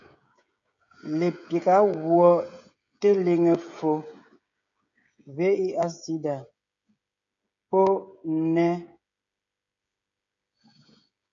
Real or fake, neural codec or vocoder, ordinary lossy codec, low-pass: fake; codec, 16 kHz, 16 kbps, FreqCodec, smaller model; MP3, 64 kbps; 7.2 kHz